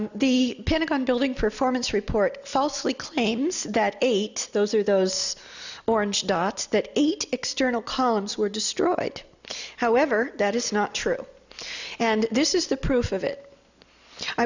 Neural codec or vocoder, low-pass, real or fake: none; 7.2 kHz; real